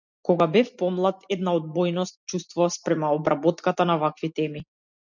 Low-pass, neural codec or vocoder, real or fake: 7.2 kHz; none; real